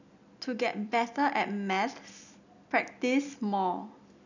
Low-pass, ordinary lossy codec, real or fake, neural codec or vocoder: 7.2 kHz; none; real; none